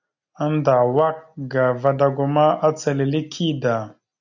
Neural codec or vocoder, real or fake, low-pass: none; real; 7.2 kHz